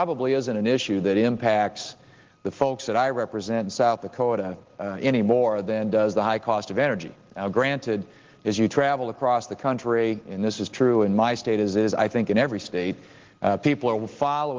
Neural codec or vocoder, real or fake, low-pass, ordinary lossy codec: none; real; 7.2 kHz; Opus, 16 kbps